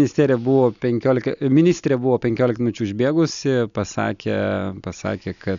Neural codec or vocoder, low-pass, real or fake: none; 7.2 kHz; real